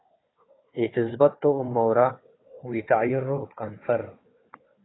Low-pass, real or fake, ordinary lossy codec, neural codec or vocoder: 7.2 kHz; fake; AAC, 16 kbps; codec, 16 kHz, 4 kbps, FunCodec, trained on Chinese and English, 50 frames a second